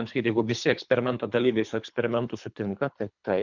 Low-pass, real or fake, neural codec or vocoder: 7.2 kHz; fake; codec, 24 kHz, 3 kbps, HILCodec